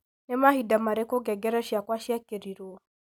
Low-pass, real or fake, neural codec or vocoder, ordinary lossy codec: none; real; none; none